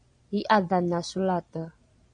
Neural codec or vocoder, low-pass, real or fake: vocoder, 22.05 kHz, 80 mel bands, Vocos; 9.9 kHz; fake